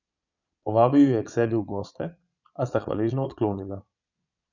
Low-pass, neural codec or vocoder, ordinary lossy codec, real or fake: 7.2 kHz; vocoder, 22.05 kHz, 80 mel bands, Vocos; none; fake